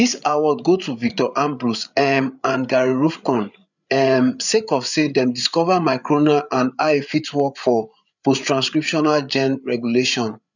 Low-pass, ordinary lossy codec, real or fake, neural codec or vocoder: 7.2 kHz; none; fake; codec, 16 kHz, 8 kbps, FreqCodec, larger model